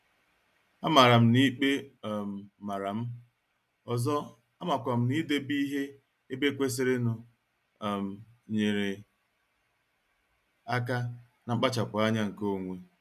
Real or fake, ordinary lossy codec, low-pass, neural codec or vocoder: real; none; 14.4 kHz; none